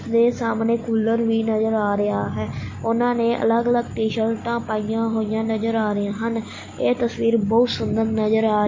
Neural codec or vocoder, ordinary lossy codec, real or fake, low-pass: none; MP3, 32 kbps; real; 7.2 kHz